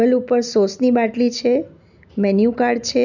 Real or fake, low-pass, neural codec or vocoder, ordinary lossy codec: real; 7.2 kHz; none; none